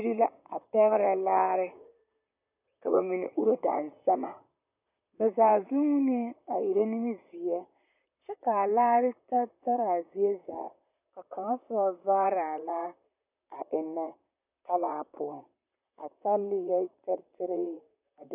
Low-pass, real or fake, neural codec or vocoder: 3.6 kHz; fake; vocoder, 44.1 kHz, 128 mel bands, Pupu-Vocoder